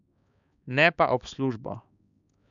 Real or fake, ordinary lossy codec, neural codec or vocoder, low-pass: fake; none; codec, 16 kHz, 4 kbps, X-Codec, HuBERT features, trained on balanced general audio; 7.2 kHz